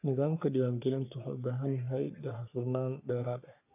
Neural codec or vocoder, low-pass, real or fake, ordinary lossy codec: codec, 44.1 kHz, 3.4 kbps, Pupu-Codec; 3.6 kHz; fake; AAC, 32 kbps